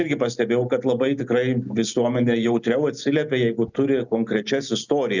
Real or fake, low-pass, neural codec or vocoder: real; 7.2 kHz; none